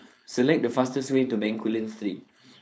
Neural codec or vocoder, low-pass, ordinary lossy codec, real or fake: codec, 16 kHz, 4.8 kbps, FACodec; none; none; fake